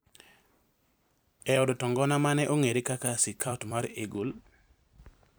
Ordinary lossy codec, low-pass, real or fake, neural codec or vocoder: none; none; fake; vocoder, 44.1 kHz, 128 mel bands every 256 samples, BigVGAN v2